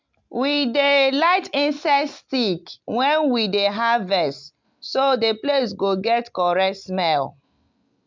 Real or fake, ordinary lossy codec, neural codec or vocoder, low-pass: real; MP3, 64 kbps; none; 7.2 kHz